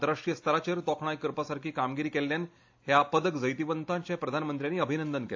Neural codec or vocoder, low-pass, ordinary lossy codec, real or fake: none; 7.2 kHz; AAC, 48 kbps; real